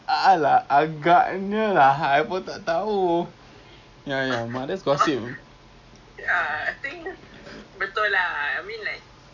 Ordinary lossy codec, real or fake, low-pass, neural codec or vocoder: none; real; 7.2 kHz; none